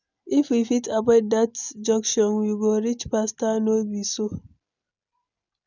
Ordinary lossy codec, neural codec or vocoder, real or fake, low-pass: none; none; real; 7.2 kHz